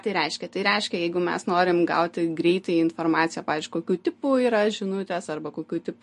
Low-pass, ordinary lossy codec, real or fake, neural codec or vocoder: 14.4 kHz; MP3, 48 kbps; real; none